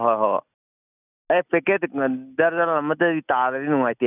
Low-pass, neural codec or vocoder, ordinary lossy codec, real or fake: 3.6 kHz; none; none; real